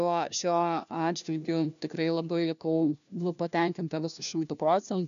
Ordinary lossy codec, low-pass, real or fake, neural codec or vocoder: MP3, 96 kbps; 7.2 kHz; fake; codec, 16 kHz, 1 kbps, FunCodec, trained on LibriTTS, 50 frames a second